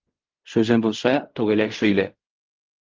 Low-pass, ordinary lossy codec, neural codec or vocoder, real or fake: 7.2 kHz; Opus, 32 kbps; codec, 16 kHz in and 24 kHz out, 0.4 kbps, LongCat-Audio-Codec, fine tuned four codebook decoder; fake